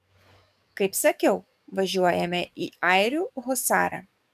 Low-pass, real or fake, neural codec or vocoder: 14.4 kHz; fake; codec, 44.1 kHz, 7.8 kbps, DAC